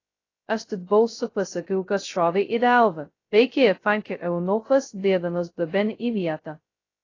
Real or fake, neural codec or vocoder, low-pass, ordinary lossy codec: fake; codec, 16 kHz, 0.2 kbps, FocalCodec; 7.2 kHz; AAC, 32 kbps